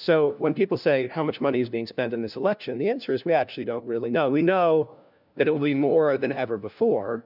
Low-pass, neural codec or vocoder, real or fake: 5.4 kHz; codec, 16 kHz, 1 kbps, FunCodec, trained on LibriTTS, 50 frames a second; fake